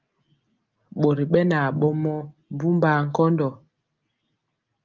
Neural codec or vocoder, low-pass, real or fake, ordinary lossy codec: none; 7.2 kHz; real; Opus, 32 kbps